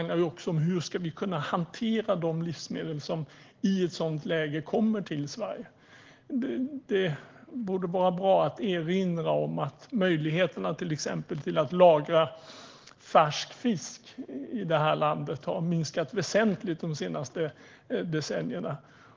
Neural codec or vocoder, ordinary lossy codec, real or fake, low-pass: none; Opus, 16 kbps; real; 7.2 kHz